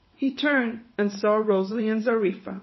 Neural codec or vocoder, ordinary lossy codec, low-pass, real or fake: vocoder, 22.05 kHz, 80 mel bands, WaveNeXt; MP3, 24 kbps; 7.2 kHz; fake